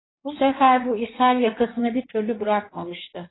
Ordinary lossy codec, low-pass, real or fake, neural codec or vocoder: AAC, 16 kbps; 7.2 kHz; fake; codec, 16 kHz in and 24 kHz out, 1.1 kbps, FireRedTTS-2 codec